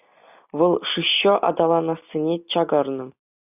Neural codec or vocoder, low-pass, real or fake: none; 3.6 kHz; real